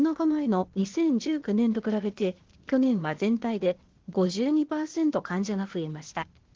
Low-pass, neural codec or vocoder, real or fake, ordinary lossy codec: 7.2 kHz; codec, 16 kHz, 0.8 kbps, ZipCodec; fake; Opus, 16 kbps